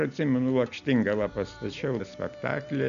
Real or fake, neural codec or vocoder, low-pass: real; none; 7.2 kHz